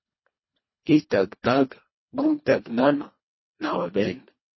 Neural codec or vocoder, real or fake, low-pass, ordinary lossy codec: codec, 24 kHz, 1.5 kbps, HILCodec; fake; 7.2 kHz; MP3, 24 kbps